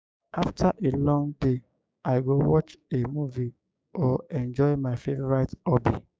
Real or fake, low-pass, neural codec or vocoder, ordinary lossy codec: fake; none; codec, 16 kHz, 6 kbps, DAC; none